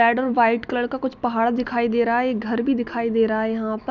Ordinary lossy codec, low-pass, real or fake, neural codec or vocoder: none; 7.2 kHz; real; none